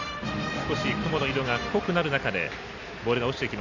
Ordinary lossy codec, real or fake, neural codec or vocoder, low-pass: none; fake; vocoder, 44.1 kHz, 128 mel bands every 256 samples, BigVGAN v2; 7.2 kHz